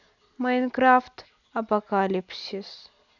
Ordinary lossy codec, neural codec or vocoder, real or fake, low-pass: none; none; real; 7.2 kHz